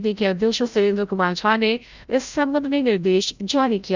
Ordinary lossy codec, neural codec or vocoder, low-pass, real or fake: Opus, 64 kbps; codec, 16 kHz, 0.5 kbps, FreqCodec, larger model; 7.2 kHz; fake